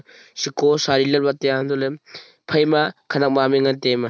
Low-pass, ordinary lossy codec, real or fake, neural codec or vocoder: none; none; real; none